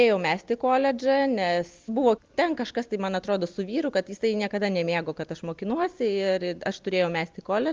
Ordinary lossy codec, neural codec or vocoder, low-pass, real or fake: Opus, 24 kbps; none; 7.2 kHz; real